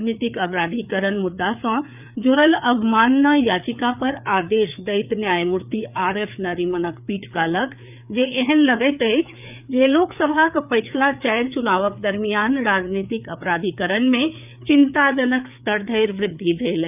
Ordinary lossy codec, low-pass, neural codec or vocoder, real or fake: none; 3.6 kHz; codec, 16 kHz, 4 kbps, FreqCodec, larger model; fake